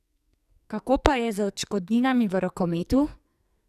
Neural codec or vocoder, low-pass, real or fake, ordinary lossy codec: codec, 44.1 kHz, 2.6 kbps, SNAC; 14.4 kHz; fake; none